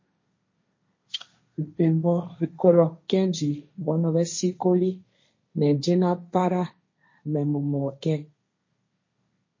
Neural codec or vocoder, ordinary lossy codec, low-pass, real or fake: codec, 16 kHz, 1.1 kbps, Voila-Tokenizer; MP3, 32 kbps; 7.2 kHz; fake